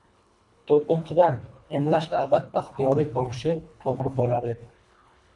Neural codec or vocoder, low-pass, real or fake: codec, 24 kHz, 1.5 kbps, HILCodec; 10.8 kHz; fake